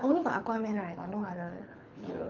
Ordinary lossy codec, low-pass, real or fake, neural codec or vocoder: Opus, 24 kbps; 7.2 kHz; fake; codec, 24 kHz, 6 kbps, HILCodec